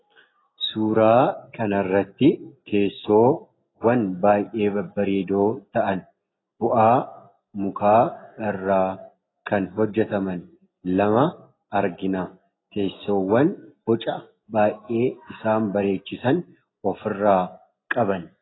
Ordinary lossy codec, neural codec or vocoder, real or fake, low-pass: AAC, 16 kbps; none; real; 7.2 kHz